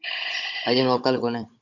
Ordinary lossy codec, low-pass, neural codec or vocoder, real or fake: Opus, 64 kbps; 7.2 kHz; codec, 16 kHz, 16 kbps, FunCodec, trained on Chinese and English, 50 frames a second; fake